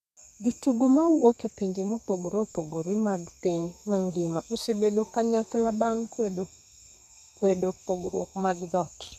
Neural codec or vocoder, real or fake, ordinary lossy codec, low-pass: codec, 32 kHz, 1.9 kbps, SNAC; fake; none; 14.4 kHz